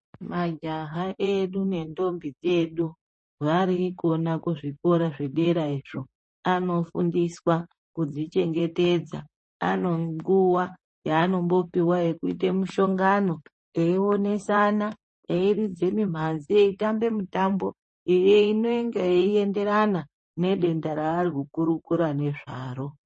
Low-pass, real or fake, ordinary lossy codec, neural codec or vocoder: 10.8 kHz; fake; MP3, 32 kbps; vocoder, 44.1 kHz, 128 mel bands, Pupu-Vocoder